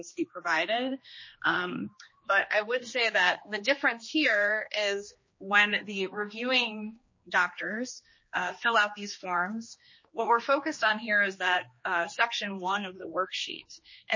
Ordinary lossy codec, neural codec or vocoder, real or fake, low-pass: MP3, 32 kbps; codec, 16 kHz, 2 kbps, X-Codec, HuBERT features, trained on general audio; fake; 7.2 kHz